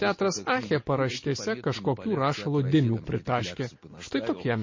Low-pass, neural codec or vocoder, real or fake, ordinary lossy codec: 7.2 kHz; none; real; MP3, 32 kbps